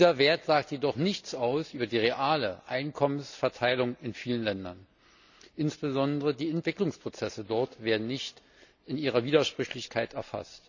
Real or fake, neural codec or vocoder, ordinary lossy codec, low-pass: real; none; none; 7.2 kHz